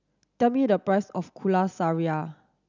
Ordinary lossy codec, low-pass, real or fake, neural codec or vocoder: none; 7.2 kHz; real; none